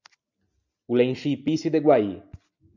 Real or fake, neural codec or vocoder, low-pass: real; none; 7.2 kHz